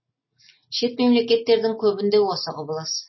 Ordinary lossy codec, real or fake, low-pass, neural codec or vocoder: MP3, 24 kbps; real; 7.2 kHz; none